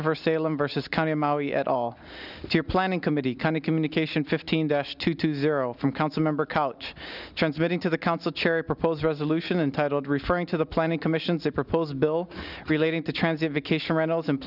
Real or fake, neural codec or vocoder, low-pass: real; none; 5.4 kHz